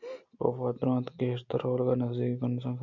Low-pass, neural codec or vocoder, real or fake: 7.2 kHz; none; real